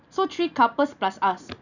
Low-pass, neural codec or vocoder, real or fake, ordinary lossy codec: 7.2 kHz; none; real; none